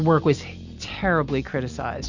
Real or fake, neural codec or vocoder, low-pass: real; none; 7.2 kHz